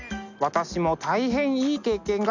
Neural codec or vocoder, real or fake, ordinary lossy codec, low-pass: none; real; none; 7.2 kHz